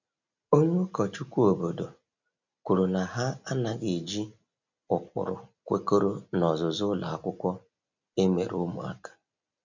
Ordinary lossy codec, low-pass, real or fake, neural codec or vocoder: none; 7.2 kHz; real; none